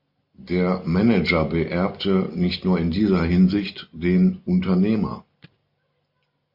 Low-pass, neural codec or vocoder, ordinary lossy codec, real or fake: 5.4 kHz; none; MP3, 48 kbps; real